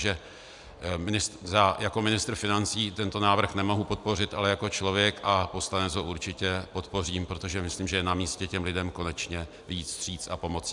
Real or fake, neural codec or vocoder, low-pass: real; none; 10.8 kHz